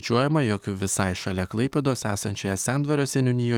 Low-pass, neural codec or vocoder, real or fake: 19.8 kHz; codec, 44.1 kHz, 7.8 kbps, DAC; fake